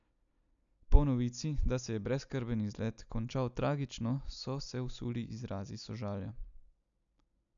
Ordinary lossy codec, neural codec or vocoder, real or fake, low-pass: none; none; real; 7.2 kHz